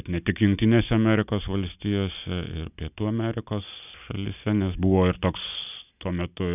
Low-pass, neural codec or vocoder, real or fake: 3.6 kHz; none; real